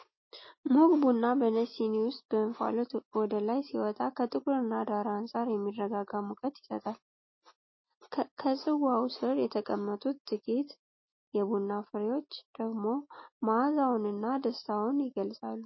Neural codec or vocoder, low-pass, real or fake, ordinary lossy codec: none; 7.2 kHz; real; MP3, 24 kbps